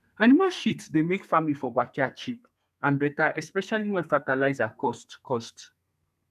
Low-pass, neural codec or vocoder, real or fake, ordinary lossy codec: 14.4 kHz; codec, 32 kHz, 1.9 kbps, SNAC; fake; none